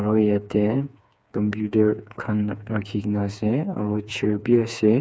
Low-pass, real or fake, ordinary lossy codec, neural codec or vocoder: none; fake; none; codec, 16 kHz, 4 kbps, FreqCodec, smaller model